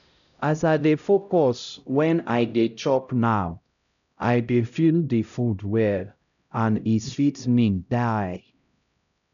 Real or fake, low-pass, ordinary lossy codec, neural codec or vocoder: fake; 7.2 kHz; none; codec, 16 kHz, 0.5 kbps, X-Codec, HuBERT features, trained on LibriSpeech